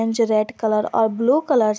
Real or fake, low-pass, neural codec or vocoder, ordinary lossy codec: real; none; none; none